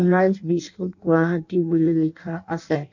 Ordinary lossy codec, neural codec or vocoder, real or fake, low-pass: none; codec, 16 kHz in and 24 kHz out, 0.6 kbps, FireRedTTS-2 codec; fake; 7.2 kHz